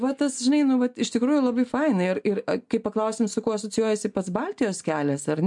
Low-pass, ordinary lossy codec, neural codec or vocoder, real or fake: 10.8 kHz; MP3, 64 kbps; none; real